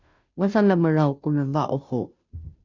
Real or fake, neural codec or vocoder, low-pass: fake; codec, 16 kHz, 0.5 kbps, FunCodec, trained on Chinese and English, 25 frames a second; 7.2 kHz